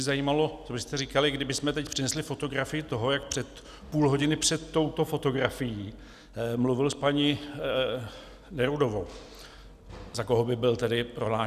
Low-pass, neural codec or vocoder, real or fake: 14.4 kHz; none; real